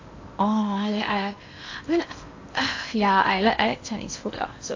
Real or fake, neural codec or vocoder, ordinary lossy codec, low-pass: fake; codec, 16 kHz in and 24 kHz out, 0.8 kbps, FocalCodec, streaming, 65536 codes; AAC, 48 kbps; 7.2 kHz